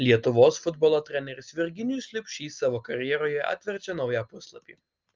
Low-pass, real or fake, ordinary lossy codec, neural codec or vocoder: 7.2 kHz; real; Opus, 32 kbps; none